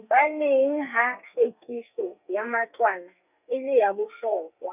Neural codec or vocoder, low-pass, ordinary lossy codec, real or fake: codec, 32 kHz, 1.9 kbps, SNAC; 3.6 kHz; none; fake